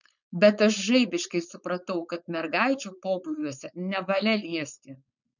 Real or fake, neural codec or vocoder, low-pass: fake; codec, 16 kHz, 4.8 kbps, FACodec; 7.2 kHz